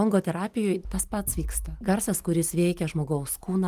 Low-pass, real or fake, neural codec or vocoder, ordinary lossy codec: 14.4 kHz; real; none; Opus, 24 kbps